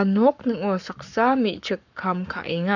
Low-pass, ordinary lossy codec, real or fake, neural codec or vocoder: 7.2 kHz; none; fake; codec, 44.1 kHz, 7.8 kbps, Pupu-Codec